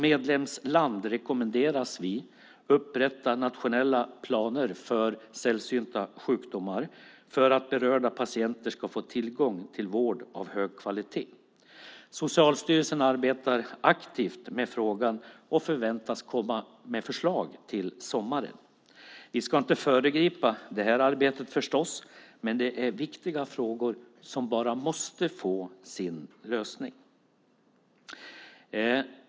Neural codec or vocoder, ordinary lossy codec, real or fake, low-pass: none; none; real; none